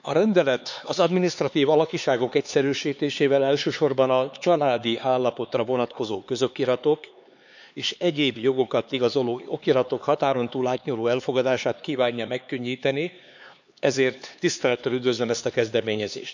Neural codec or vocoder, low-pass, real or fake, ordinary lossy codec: codec, 16 kHz, 4 kbps, X-Codec, HuBERT features, trained on LibriSpeech; 7.2 kHz; fake; none